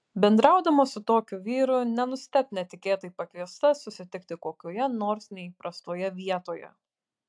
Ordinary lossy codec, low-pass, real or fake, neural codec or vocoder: AAC, 64 kbps; 9.9 kHz; real; none